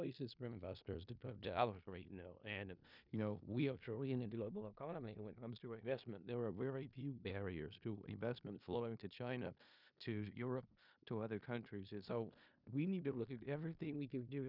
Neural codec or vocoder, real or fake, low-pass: codec, 16 kHz in and 24 kHz out, 0.4 kbps, LongCat-Audio-Codec, four codebook decoder; fake; 5.4 kHz